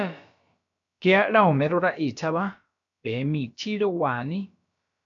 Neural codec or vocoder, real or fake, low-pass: codec, 16 kHz, about 1 kbps, DyCAST, with the encoder's durations; fake; 7.2 kHz